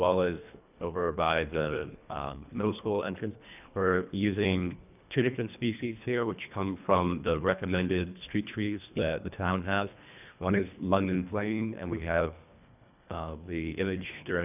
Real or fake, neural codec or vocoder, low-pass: fake; codec, 24 kHz, 1.5 kbps, HILCodec; 3.6 kHz